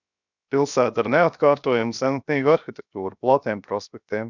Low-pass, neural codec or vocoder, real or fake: 7.2 kHz; codec, 16 kHz, 0.7 kbps, FocalCodec; fake